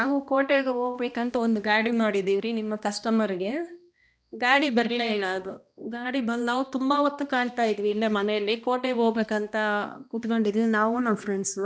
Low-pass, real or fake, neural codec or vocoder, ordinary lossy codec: none; fake; codec, 16 kHz, 1 kbps, X-Codec, HuBERT features, trained on balanced general audio; none